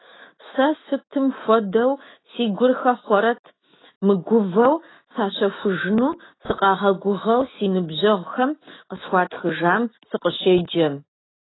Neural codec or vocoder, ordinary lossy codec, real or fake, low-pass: autoencoder, 48 kHz, 128 numbers a frame, DAC-VAE, trained on Japanese speech; AAC, 16 kbps; fake; 7.2 kHz